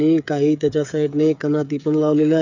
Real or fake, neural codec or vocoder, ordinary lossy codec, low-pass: fake; codec, 16 kHz, 8 kbps, FreqCodec, smaller model; none; 7.2 kHz